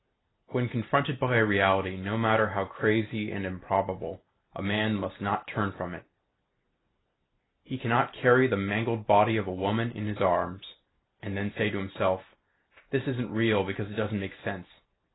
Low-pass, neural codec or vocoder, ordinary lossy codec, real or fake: 7.2 kHz; none; AAC, 16 kbps; real